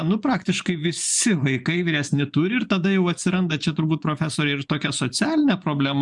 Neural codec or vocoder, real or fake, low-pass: vocoder, 24 kHz, 100 mel bands, Vocos; fake; 10.8 kHz